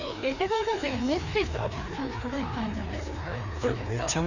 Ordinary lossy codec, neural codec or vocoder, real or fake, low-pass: none; codec, 16 kHz, 2 kbps, FreqCodec, larger model; fake; 7.2 kHz